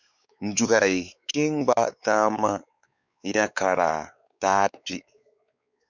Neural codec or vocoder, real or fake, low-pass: codec, 16 kHz, 4 kbps, X-Codec, WavLM features, trained on Multilingual LibriSpeech; fake; 7.2 kHz